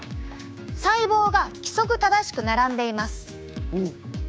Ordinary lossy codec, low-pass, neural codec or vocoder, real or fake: none; none; codec, 16 kHz, 6 kbps, DAC; fake